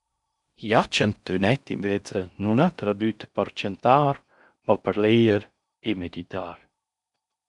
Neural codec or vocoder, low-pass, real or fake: codec, 16 kHz in and 24 kHz out, 0.6 kbps, FocalCodec, streaming, 4096 codes; 10.8 kHz; fake